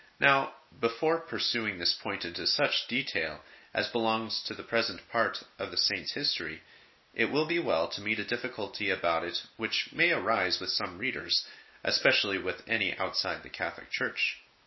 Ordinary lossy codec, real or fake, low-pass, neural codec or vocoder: MP3, 24 kbps; real; 7.2 kHz; none